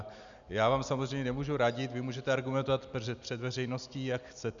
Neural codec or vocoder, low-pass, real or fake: none; 7.2 kHz; real